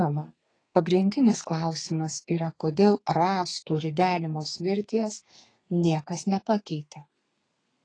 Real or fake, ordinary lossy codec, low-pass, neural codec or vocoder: fake; AAC, 32 kbps; 9.9 kHz; codec, 32 kHz, 1.9 kbps, SNAC